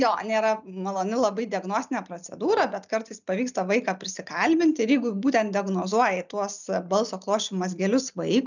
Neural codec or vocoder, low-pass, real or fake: none; 7.2 kHz; real